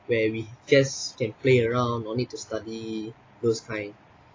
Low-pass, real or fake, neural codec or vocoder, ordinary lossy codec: 7.2 kHz; real; none; AAC, 32 kbps